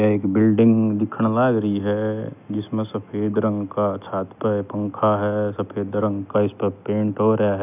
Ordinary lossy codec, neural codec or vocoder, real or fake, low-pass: none; none; real; 3.6 kHz